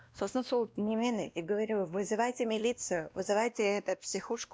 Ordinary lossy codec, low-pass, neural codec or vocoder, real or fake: none; none; codec, 16 kHz, 1 kbps, X-Codec, WavLM features, trained on Multilingual LibriSpeech; fake